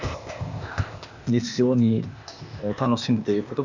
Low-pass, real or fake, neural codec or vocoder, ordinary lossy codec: 7.2 kHz; fake; codec, 16 kHz, 0.8 kbps, ZipCodec; none